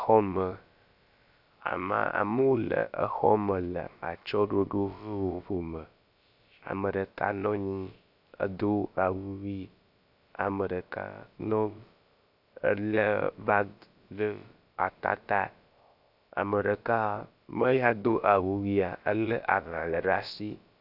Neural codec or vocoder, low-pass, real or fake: codec, 16 kHz, about 1 kbps, DyCAST, with the encoder's durations; 5.4 kHz; fake